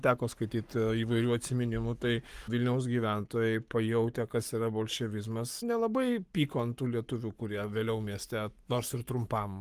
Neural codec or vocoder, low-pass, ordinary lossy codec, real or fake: codec, 44.1 kHz, 7.8 kbps, Pupu-Codec; 14.4 kHz; Opus, 32 kbps; fake